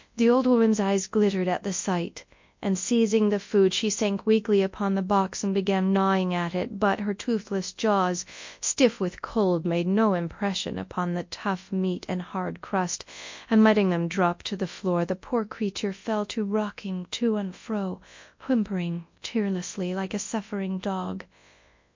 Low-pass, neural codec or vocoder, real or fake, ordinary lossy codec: 7.2 kHz; codec, 24 kHz, 0.9 kbps, WavTokenizer, large speech release; fake; MP3, 48 kbps